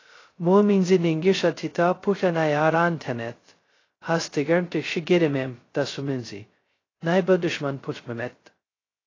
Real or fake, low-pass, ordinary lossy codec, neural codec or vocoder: fake; 7.2 kHz; AAC, 32 kbps; codec, 16 kHz, 0.2 kbps, FocalCodec